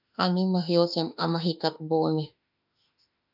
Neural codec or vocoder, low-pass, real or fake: autoencoder, 48 kHz, 32 numbers a frame, DAC-VAE, trained on Japanese speech; 5.4 kHz; fake